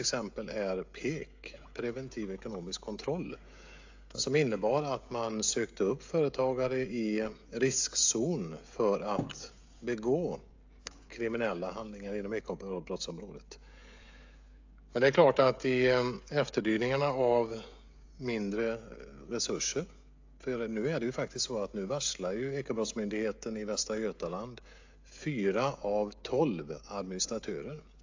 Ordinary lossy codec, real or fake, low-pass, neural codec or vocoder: AAC, 48 kbps; fake; 7.2 kHz; codec, 16 kHz, 16 kbps, FreqCodec, smaller model